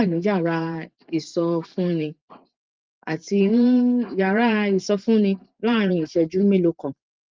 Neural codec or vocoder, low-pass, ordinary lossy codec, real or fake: vocoder, 44.1 kHz, 128 mel bands every 512 samples, BigVGAN v2; 7.2 kHz; Opus, 32 kbps; fake